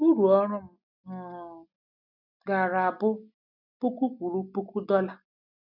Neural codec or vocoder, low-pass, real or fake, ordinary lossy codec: none; 5.4 kHz; real; none